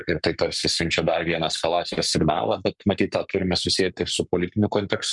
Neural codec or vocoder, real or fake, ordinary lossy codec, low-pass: codec, 44.1 kHz, 7.8 kbps, Pupu-Codec; fake; MP3, 96 kbps; 10.8 kHz